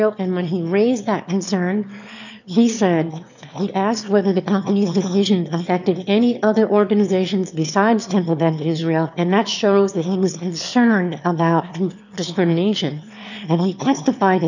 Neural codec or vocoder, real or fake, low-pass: autoencoder, 22.05 kHz, a latent of 192 numbers a frame, VITS, trained on one speaker; fake; 7.2 kHz